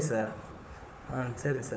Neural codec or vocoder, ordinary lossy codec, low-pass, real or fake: codec, 16 kHz, 4 kbps, FunCodec, trained on Chinese and English, 50 frames a second; none; none; fake